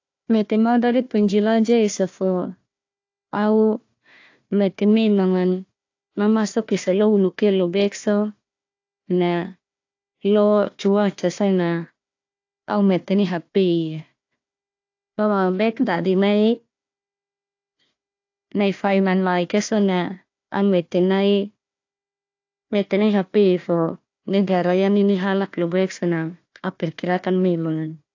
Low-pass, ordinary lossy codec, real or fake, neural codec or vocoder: 7.2 kHz; AAC, 48 kbps; fake; codec, 16 kHz, 1 kbps, FunCodec, trained on Chinese and English, 50 frames a second